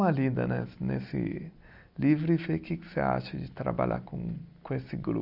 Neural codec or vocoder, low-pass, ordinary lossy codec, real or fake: none; 5.4 kHz; none; real